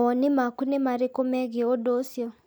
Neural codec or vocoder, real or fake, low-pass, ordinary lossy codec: none; real; none; none